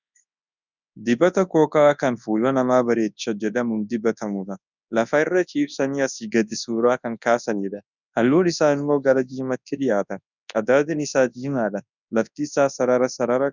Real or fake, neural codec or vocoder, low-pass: fake; codec, 24 kHz, 0.9 kbps, WavTokenizer, large speech release; 7.2 kHz